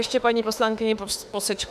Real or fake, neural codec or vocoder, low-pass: fake; autoencoder, 48 kHz, 32 numbers a frame, DAC-VAE, trained on Japanese speech; 14.4 kHz